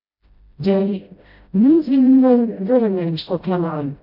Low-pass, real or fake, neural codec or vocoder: 5.4 kHz; fake; codec, 16 kHz, 0.5 kbps, FreqCodec, smaller model